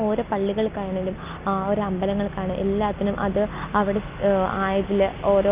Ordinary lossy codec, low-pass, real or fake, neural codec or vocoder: Opus, 24 kbps; 3.6 kHz; real; none